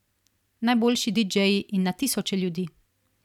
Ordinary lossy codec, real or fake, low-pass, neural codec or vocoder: none; fake; 19.8 kHz; vocoder, 44.1 kHz, 128 mel bands every 256 samples, BigVGAN v2